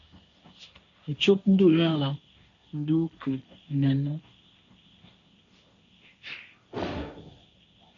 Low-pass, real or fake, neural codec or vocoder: 7.2 kHz; fake; codec, 16 kHz, 1.1 kbps, Voila-Tokenizer